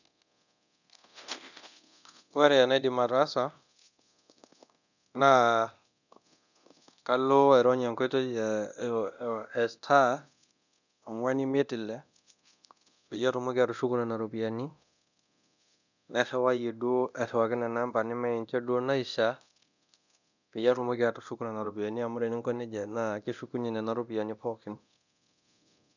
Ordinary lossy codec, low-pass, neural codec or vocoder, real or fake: none; 7.2 kHz; codec, 24 kHz, 0.9 kbps, DualCodec; fake